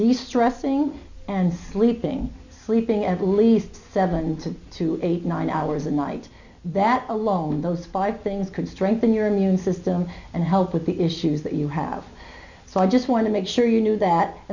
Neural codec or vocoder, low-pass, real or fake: none; 7.2 kHz; real